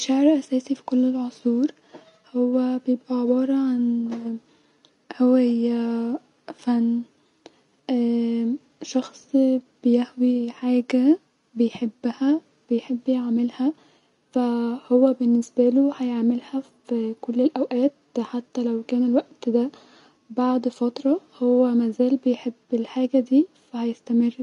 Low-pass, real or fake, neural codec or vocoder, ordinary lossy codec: 10.8 kHz; real; none; MP3, 96 kbps